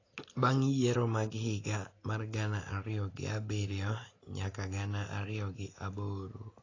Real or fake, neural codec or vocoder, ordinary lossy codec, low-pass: real; none; AAC, 32 kbps; 7.2 kHz